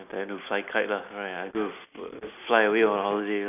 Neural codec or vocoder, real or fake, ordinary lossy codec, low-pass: none; real; none; 3.6 kHz